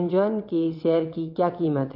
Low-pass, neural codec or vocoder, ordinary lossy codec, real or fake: 5.4 kHz; vocoder, 22.05 kHz, 80 mel bands, WaveNeXt; MP3, 32 kbps; fake